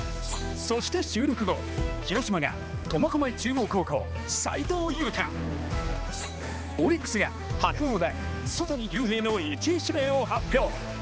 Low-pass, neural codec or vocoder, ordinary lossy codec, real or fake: none; codec, 16 kHz, 2 kbps, X-Codec, HuBERT features, trained on balanced general audio; none; fake